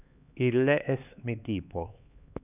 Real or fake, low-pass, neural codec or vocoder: fake; 3.6 kHz; codec, 16 kHz, 4 kbps, X-Codec, HuBERT features, trained on LibriSpeech